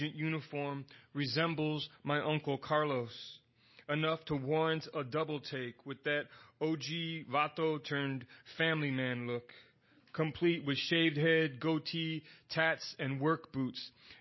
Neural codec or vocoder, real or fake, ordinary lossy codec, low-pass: none; real; MP3, 24 kbps; 7.2 kHz